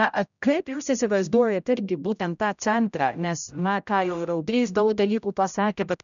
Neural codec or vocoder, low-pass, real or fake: codec, 16 kHz, 0.5 kbps, X-Codec, HuBERT features, trained on general audio; 7.2 kHz; fake